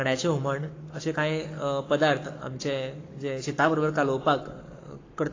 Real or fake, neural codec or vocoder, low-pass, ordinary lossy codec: fake; codec, 44.1 kHz, 7.8 kbps, Pupu-Codec; 7.2 kHz; AAC, 32 kbps